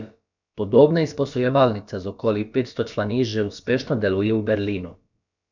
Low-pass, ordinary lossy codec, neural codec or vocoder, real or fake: 7.2 kHz; none; codec, 16 kHz, about 1 kbps, DyCAST, with the encoder's durations; fake